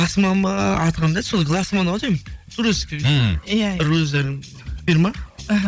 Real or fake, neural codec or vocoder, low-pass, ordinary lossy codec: fake; codec, 16 kHz, 16 kbps, FunCodec, trained on Chinese and English, 50 frames a second; none; none